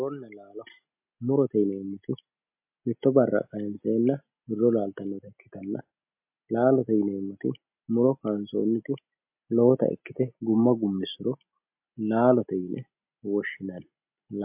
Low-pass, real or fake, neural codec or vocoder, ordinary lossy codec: 3.6 kHz; real; none; MP3, 32 kbps